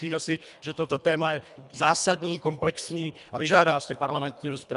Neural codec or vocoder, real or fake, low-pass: codec, 24 kHz, 1.5 kbps, HILCodec; fake; 10.8 kHz